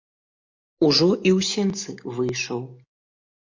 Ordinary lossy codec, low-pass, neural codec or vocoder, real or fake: MP3, 48 kbps; 7.2 kHz; none; real